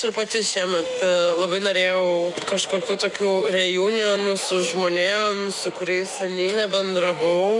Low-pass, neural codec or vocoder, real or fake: 10.8 kHz; autoencoder, 48 kHz, 32 numbers a frame, DAC-VAE, trained on Japanese speech; fake